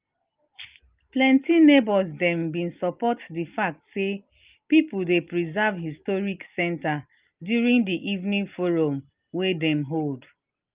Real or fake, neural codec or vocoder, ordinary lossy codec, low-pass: real; none; Opus, 32 kbps; 3.6 kHz